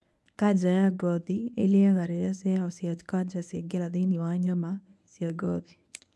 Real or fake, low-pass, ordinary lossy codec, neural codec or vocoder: fake; none; none; codec, 24 kHz, 0.9 kbps, WavTokenizer, medium speech release version 1